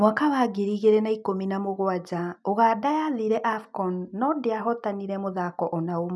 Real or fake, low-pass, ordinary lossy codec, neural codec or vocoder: real; none; none; none